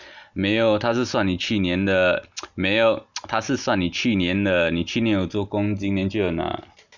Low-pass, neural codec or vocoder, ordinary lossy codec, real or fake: 7.2 kHz; none; none; real